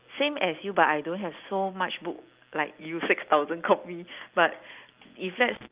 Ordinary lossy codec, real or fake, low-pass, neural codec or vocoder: Opus, 32 kbps; real; 3.6 kHz; none